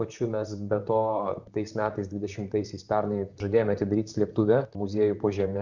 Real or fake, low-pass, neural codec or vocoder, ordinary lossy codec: fake; 7.2 kHz; vocoder, 44.1 kHz, 128 mel bands, Pupu-Vocoder; Opus, 64 kbps